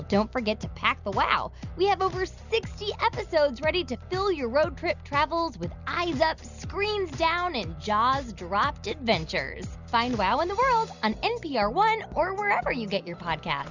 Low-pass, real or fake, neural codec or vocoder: 7.2 kHz; real; none